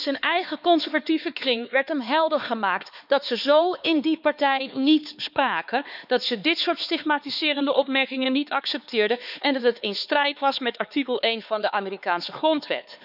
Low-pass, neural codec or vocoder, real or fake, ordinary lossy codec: 5.4 kHz; codec, 16 kHz, 4 kbps, X-Codec, HuBERT features, trained on LibriSpeech; fake; none